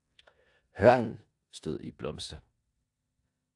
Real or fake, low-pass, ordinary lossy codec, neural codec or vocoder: fake; 10.8 kHz; AAC, 64 kbps; codec, 16 kHz in and 24 kHz out, 0.9 kbps, LongCat-Audio-Codec, four codebook decoder